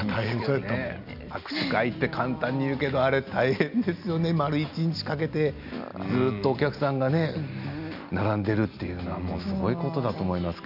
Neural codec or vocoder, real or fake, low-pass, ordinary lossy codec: none; real; 5.4 kHz; AAC, 48 kbps